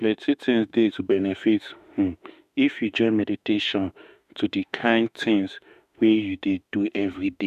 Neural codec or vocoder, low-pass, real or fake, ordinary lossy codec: autoencoder, 48 kHz, 32 numbers a frame, DAC-VAE, trained on Japanese speech; 14.4 kHz; fake; none